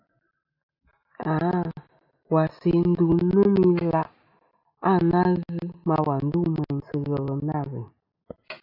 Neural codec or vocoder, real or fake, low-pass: none; real; 5.4 kHz